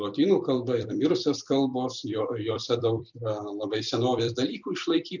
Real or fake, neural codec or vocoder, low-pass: fake; vocoder, 44.1 kHz, 128 mel bands every 256 samples, BigVGAN v2; 7.2 kHz